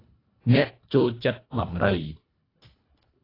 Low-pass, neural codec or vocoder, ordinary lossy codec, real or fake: 5.4 kHz; codec, 24 kHz, 1.5 kbps, HILCodec; AAC, 24 kbps; fake